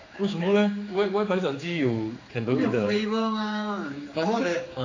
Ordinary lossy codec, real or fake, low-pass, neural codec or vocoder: AAC, 32 kbps; fake; 7.2 kHz; codec, 16 kHz, 4 kbps, X-Codec, HuBERT features, trained on general audio